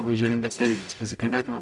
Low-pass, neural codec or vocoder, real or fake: 10.8 kHz; codec, 44.1 kHz, 0.9 kbps, DAC; fake